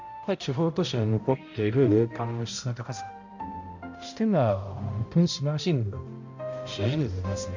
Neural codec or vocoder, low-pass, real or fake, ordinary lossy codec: codec, 16 kHz, 0.5 kbps, X-Codec, HuBERT features, trained on balanced general audio; 7.2 kHz; fake; MP3, 64 kbps